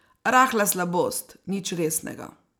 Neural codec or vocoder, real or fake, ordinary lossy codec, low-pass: none; real; none; none